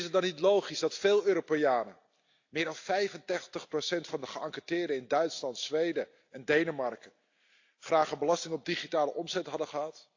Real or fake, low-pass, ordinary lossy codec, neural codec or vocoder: real; 7.2 kHz; AAC, 48 kbps; none